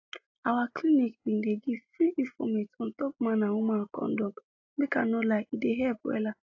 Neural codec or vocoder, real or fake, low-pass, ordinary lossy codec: none; real; 7.2 kHz; AAC, 48 kbps